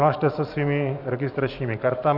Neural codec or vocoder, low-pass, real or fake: vocoder, 44.1 kHz, 128 mel bands every 512 samples, BigVGAN v2; 5.4 kHz; fake